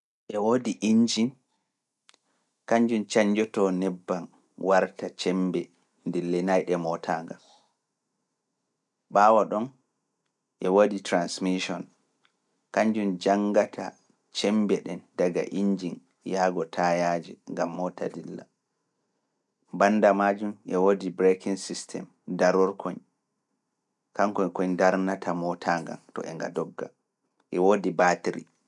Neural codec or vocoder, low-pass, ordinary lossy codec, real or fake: none; 10.8 kHz; none; real